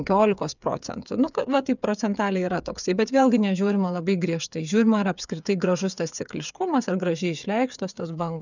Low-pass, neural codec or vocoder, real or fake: 7.2 kHz; codec, 16 kHz, 8 kbps, FreqCodec, smaller model; fake